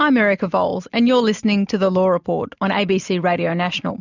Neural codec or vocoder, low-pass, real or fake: none; 7.2 kHz; real